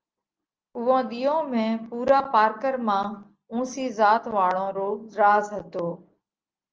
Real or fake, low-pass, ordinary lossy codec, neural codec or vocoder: real; 7.2 kHz; Opus, 24 kbps; none